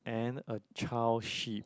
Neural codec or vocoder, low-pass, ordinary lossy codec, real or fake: none; none; none; real